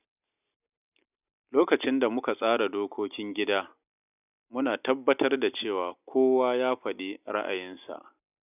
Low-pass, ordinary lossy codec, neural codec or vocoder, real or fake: 3.6 kHz; none; none; real